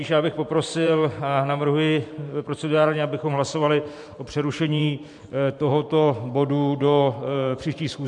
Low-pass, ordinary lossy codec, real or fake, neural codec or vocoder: 10.8 kHz; MP3, 64 kbps; fake; vocoder, 44.1 kHz, 128 mel bands every 512 samples, BigVGAN v2